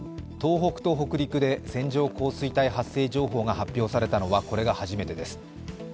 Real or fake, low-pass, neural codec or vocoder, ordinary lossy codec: real; none; none; none